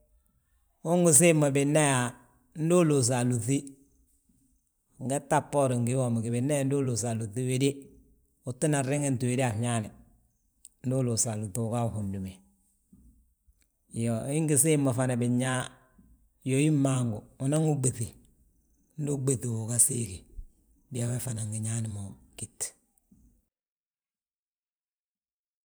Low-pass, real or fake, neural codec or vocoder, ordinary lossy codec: none; fake; vocoder, 44.1 kHz, 128 mel bands every 512 samples, BigVGAN v2; none